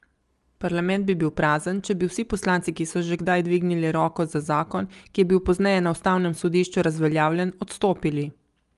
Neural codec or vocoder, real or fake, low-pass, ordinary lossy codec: none; real; 10.8 kHz; Opus, 32 kbps